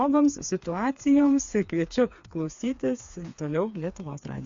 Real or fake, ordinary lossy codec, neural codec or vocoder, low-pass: fake; MP3, 48 kbps; codec, 16 kHz, 4 kbps, FreqCodec, smaller model; 7.2 kHz